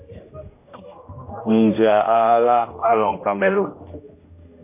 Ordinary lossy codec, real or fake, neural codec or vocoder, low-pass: MP3, 24 kbps; fake; codec, 16 kHz, 1 kbps, X-Codec, HuBERT features, trained on general audio; 3.6 kHz